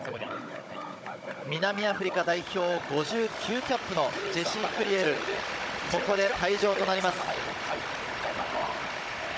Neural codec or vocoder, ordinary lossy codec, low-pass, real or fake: codec, 16 kHz, 16 kbps, FunCodec, trained on Chinese and English, 50 frames a second; none; none; fake